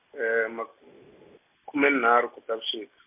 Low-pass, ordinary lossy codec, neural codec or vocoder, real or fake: 3.6 kHz; MP3, 32 kbps; none; real